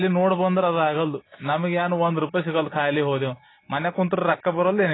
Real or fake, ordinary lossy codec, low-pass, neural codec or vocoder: real; AAC, 16 kbps; 7.2 kHz; none